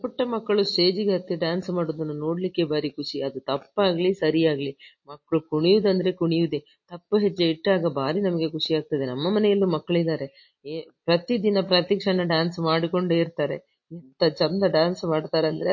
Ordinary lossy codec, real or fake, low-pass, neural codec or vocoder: MP3, 32 kbps; real; 7.2 kHz; none